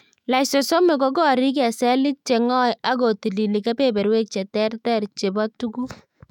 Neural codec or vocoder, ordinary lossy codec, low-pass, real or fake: autoencoder, 48 kHz, 128 numbers a frame, DAC-VAE, trained on Japanese speech; none; 19.8 kHz; fake